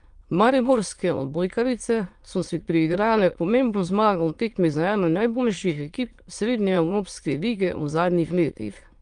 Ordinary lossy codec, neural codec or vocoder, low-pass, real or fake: Opus, 32 kbps; autoencoder, 22.05 kHz, a latent of 192 numbers a frame, VITS, trained on many speakers; 9.9 kHz; fake